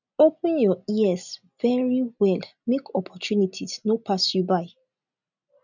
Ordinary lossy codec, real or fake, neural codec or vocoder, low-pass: none; real; none; 7.2 kHz